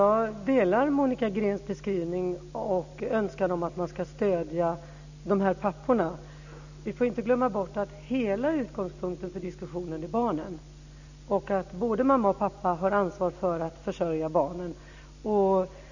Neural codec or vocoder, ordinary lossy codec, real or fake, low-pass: none; none; real; 7.2 kHz